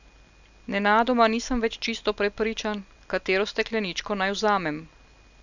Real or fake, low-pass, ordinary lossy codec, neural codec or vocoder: real; 7.2 kHz; none; none